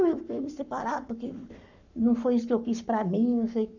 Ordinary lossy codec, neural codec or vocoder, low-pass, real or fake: none; codec, 44.1 kHz, 7.8 kbps, Pupu-Codec; 7.2 kHz; fake